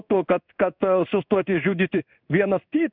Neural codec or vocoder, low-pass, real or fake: codec, 16 kHz in and 24 kHz out, 1 kbps, XY-Tokenizer; 5.4 kHz; fake